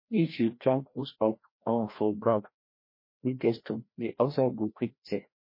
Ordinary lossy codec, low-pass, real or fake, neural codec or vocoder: MP3, 24 kbps; 5.4 kHz; fake; codec, 16 kHz, 1 kbps, FreqCodec, larger model